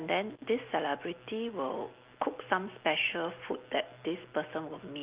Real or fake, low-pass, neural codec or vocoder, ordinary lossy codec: real; 3.6 kHz; none; Opus, 24 kbps